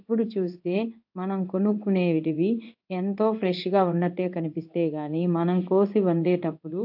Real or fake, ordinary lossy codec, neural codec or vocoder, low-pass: fake; AAC, 48 kbps; codec, 16 kHz in and 24 kHz out, 1 kbps, XY-Tokenizer; 5.4 kHz